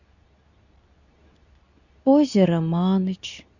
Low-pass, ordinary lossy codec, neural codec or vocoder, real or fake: 7.2 kHz; none; codec, 24 kHz, 0.9 kbps, WavTokenizer, medium speech release version 2; fake